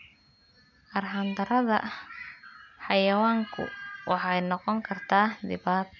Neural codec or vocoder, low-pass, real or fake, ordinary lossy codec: none; 7.2 kHz; real; none